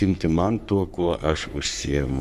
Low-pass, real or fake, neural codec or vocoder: 14.4 kHz; fake; codec, 44.1 kHz, 3.4 kbps, Pupu-Codec